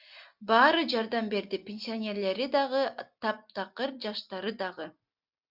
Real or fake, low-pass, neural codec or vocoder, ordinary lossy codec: real; 5.4 kHz; none; Opus, 64 kbps